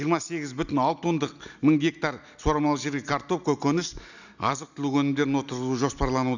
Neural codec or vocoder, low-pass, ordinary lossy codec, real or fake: none; 7.2 kHz; none; real